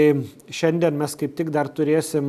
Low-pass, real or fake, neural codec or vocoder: 14.4 kHz; real; none